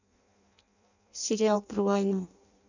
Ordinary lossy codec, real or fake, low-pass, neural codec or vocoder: none; fake; 7.2 kHz; codec, 16 kHz in and 24 kHz out, 0.6 kbps, FireRedTTS-2 codec